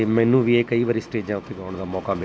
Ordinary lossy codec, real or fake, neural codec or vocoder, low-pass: none; real; none; none